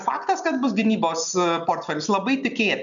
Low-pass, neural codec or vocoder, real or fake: 7.2 kHz; none; real